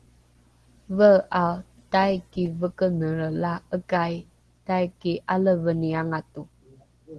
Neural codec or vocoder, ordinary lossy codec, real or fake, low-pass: none; Opus, 16 kbps; real; 10.8 kHz